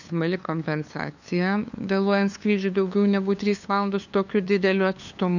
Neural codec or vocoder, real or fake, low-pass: codec, 16 kHz, 2 kbps, FunCodec, trained on LibriTTS, 25 frames a second; fake; 7.2 kHz